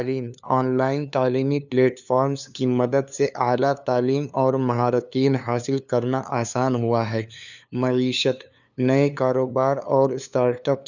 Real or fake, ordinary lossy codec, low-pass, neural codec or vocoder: fake; none; 7.2 kHz; codec, 16 kHz, 2 kbps, FunCodec, trained on LibriTTS, 25 frames a second